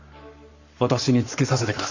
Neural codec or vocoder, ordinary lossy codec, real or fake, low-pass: codec, 44.1 kHz, 7.8 kbps, Pupu-Codec; Opus, 64 kbps; fake; 7.2 kHz